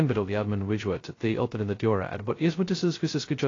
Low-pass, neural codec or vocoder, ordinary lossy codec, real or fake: 7.2 kHz; codec, 16 kHz, 0.2 kbps, FocalCodec; AAC, 32 kbps; fake